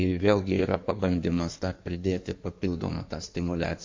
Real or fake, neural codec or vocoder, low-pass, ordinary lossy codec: fake; codec, 16 kHz in and 24 kHz out, 1.1 kbps, FireRedTTS-2 codec; 7.2 kHz; MP3, 48 kbps